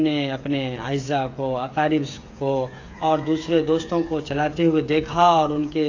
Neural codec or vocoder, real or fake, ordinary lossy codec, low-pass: codec, 16 kHz, 8 kbps, FreqCodec, smaller model; fake; AAC, 48 kbps; 7.2 kHz